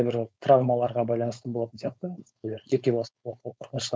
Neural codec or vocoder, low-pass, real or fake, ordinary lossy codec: codec, 16 kHz, 4.8 kbps, FACodec; none; fake; none